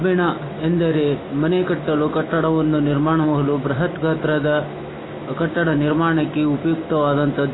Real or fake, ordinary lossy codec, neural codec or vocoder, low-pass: real; AAC, 16 kbps; none; 7.2 kHz